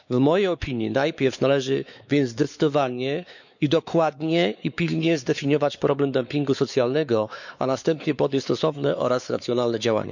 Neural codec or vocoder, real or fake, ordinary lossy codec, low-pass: codec, 16 kHz, 4 kbps, X-Codec, WavLM features, trained on Multilingual LibriSpeech; fake; none; 7.2 kHz